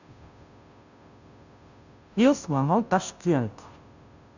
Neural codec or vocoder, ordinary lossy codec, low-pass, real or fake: codec, 16 kHz, 0.5 kbps, FunCodec, trained on Chinese and English, 25 frames a second; none; 7.2 kHz; fake